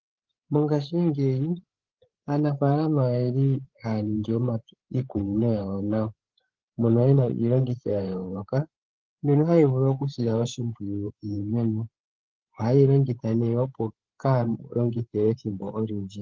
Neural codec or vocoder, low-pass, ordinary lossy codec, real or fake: codec, 16 kHz, 8 kbps, FreqCodec, larger model; 7.2 kHz; Opus, 16 kbps; fake